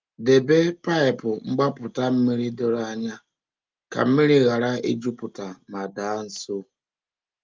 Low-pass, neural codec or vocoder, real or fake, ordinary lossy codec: 7.2 kHz; none; real; Opus, 32 kbps